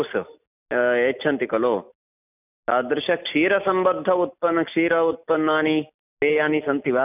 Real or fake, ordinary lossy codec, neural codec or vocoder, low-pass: real; none; none; 3.6 kHz